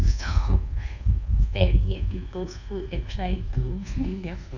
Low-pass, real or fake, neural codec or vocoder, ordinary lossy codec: 7.2 kHz; fake; codec, 24 kHz, 1.2 kbps, DualCodec; none